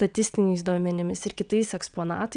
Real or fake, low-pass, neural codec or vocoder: fake; 9.9 kHz; vocoder, 22.05 kHz, 80 mel bands, WaveNeXt